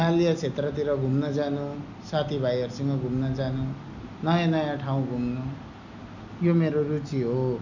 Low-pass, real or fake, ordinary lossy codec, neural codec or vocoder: 7.2 kHz; real; none; none